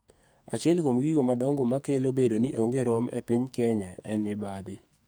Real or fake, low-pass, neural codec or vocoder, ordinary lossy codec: fake; none; codec, 44.1 kHz, 2.6 kbps, SNAC; none